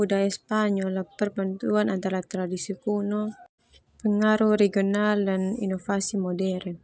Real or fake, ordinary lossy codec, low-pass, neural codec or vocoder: real; none; none; none